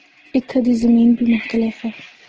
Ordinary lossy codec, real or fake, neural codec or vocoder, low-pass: Opus, 16 kbps; real; none; 7.2 kHz